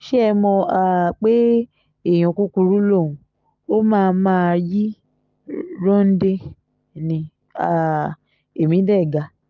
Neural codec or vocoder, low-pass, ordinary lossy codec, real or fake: none; 7.2 kHz; Opus, 32 kbps; real